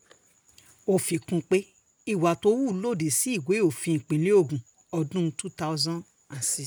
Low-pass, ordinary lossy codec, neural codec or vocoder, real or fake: none; none; none; real